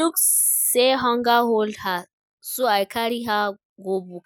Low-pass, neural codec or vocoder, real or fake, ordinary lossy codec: none; none; real; none